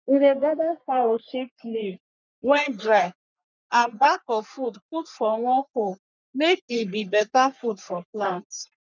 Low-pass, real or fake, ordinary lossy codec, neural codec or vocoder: 7.2 kHz; fake; none; codec, 44.1 kHz, 3.4 kbps, Pupu-Codec